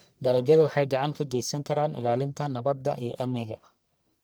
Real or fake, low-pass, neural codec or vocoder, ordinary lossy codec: fake; none; codec, 44.1 kHz, 1.7 kbps, Pupu-Codec; none